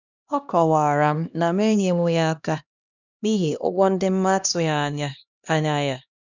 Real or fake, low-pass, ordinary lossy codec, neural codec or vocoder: fake; 7.2 kHz; none; codec, 16 kHz, 1 kbps, X-Codec, HuBERT features, trained on LibriSpeech